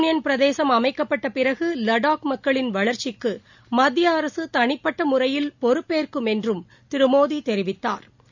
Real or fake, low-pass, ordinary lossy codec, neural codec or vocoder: real; 7.2 kHz; none; none